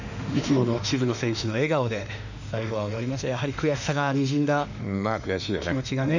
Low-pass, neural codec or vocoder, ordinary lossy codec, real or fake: 7.2 kHz; autoencoder, 48 kHz, 32 numbers a frame, DAC-VAE, trained on Japanese speech; none; fake